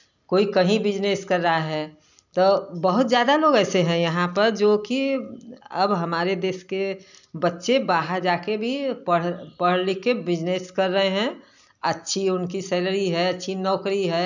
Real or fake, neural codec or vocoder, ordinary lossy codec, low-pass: real; none; none; 7.2 kHz